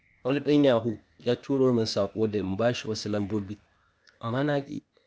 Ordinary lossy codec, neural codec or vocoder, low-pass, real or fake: none; codec, 16 kHz, 0.8 kbps, ZipCodec; none; fake